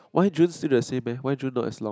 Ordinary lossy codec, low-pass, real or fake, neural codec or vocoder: none; none; real; none